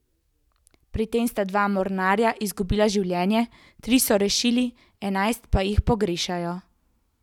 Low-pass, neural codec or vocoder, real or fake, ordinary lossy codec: 19.8 kHz; none; real; none